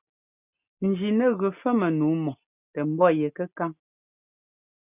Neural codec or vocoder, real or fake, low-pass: none; real; 3.6 kHz